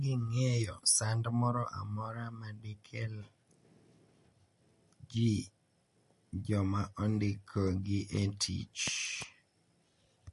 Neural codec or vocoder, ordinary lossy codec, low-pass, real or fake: none; MP3, 48 kbps; 14.4 kHz; real